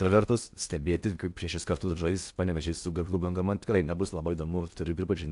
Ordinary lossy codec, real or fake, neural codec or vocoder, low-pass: AAC, 64 kbps; fake; codec, 16 kHz in and 24 kHz out, 0.8 kbps, FocalCodec, streaming, 65536 codes; 10.8 kHz